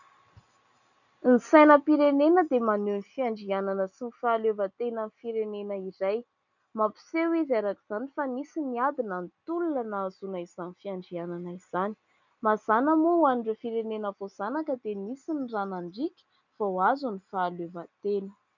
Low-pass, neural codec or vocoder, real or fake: 7.2 kHz; none; real